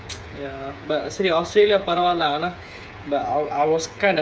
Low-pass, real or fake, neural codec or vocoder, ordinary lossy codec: none; fake; codec, 16 kHz, 8 kbps, FreqCodec, smaller model; none